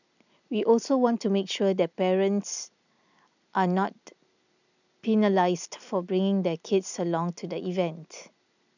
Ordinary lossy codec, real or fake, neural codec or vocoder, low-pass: none; real; none; 7.2 kHz